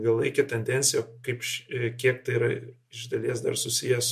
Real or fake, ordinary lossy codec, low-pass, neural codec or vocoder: real; MP3, 64 kbps; 14.4 kHz; none